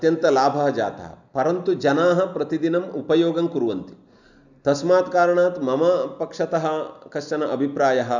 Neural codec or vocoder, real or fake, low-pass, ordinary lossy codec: none; real; 7.2 kHz; none